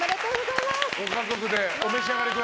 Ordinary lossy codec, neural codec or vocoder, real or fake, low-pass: none; none; real; none